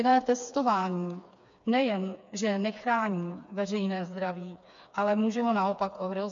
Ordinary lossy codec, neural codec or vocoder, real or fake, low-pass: MP3, 48 kbps; codec, 16 kHz, 4 kbps, FreqCodec, smaller model; fake; 7.2 kHz